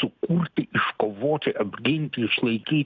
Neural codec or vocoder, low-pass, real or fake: none; 7.2 kHz; real